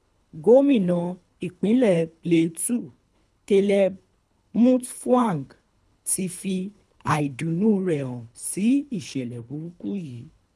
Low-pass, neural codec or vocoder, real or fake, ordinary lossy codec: none; codec, 24 kHz, 3 kbps, HILCodec; fake; none